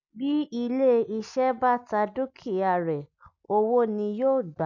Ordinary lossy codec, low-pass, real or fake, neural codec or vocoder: none; 7.2 kHz; real; none